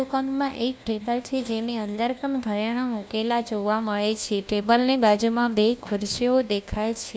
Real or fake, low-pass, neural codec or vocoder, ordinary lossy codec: fake; none; codec, 16 kHz, 1 kbps, FunCodec, trained on LibriTTS, 50 frames a second; none